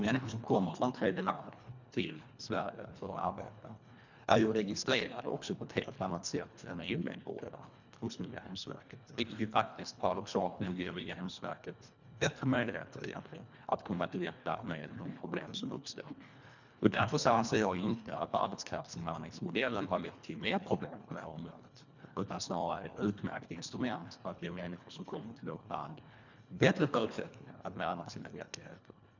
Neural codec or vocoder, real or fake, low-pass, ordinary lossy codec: codec, 24 kHz, 1.5 kbps, HILCodec; fake; 7.2 kHz; none